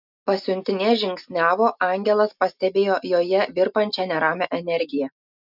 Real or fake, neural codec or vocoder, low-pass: real; none; 5.4 kHz